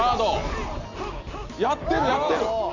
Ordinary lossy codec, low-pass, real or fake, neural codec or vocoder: none; 7.2 kHz; real; none